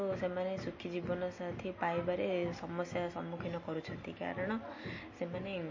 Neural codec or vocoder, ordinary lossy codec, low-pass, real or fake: none; MP3, 32 kbps; 7.2 kHz; real